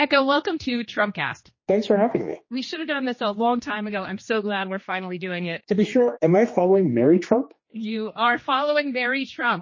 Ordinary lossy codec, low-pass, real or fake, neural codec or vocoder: MP3, 32 kbps; 7.2 kHz; fake; codec, 16 kHz, 2 kbps, X-Codec, HuBERT features, trained on general audio